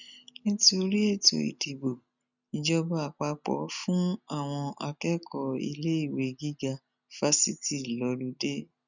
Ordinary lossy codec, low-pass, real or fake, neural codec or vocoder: none; 7.2 kHz; real; none